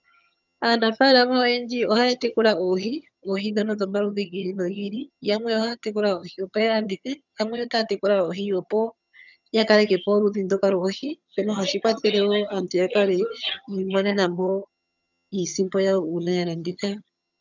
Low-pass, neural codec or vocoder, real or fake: 7.2 kHz; vocoder, 22.05 kHz, 80 mel bands, HiFi-GAN; fake